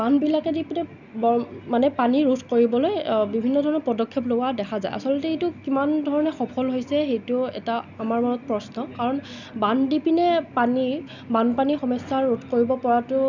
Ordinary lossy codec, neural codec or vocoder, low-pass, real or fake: none; none; 7.2 kHz; real